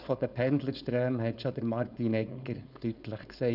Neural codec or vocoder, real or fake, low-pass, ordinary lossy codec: codec, 16 kHz, 4.8 kbps, FACodec; fake; 5.4 kHz; none